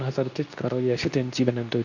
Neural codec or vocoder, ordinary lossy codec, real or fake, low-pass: codec, 24 kHz, 0.9 kbps, WavTokenizer, medium speech release version 2; none; fake; 7.2 kHz